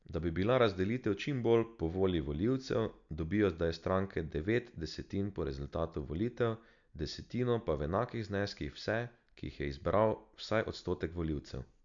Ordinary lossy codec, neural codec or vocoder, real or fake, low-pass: none; none; real; 7.2 kHz